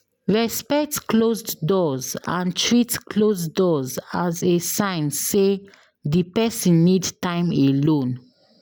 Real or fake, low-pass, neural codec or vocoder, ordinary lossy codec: real; none; none; none